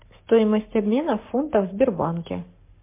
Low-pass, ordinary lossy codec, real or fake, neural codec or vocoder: 3.6 kHz; MP3, 24 kbps; fake; codec, 44.1 kHz, 7.8 kbps, Pupu-Codec